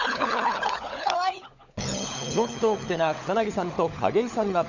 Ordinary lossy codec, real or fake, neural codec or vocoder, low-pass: none; fake; codec, 16 kHz, 16 kbps, FunCodec, trained on LibriTTS, 50 frames a second; 7.2 kHz